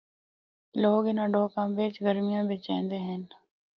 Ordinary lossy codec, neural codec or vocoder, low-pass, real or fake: Opus, 32 kbps; none; 7.2 kHz; real